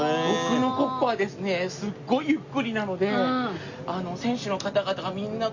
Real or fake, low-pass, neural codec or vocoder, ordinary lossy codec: real; 7.2 kHz; none; Opus, 64 kbps